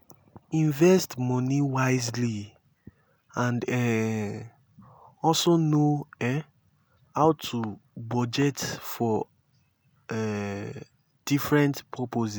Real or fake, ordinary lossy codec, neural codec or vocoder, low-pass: real; none; none; none